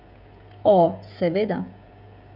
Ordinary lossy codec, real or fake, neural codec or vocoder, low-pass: none; fake; codec, 16 kHz, 16 kbps, FreqCodec, smaller model; 5.4 kHz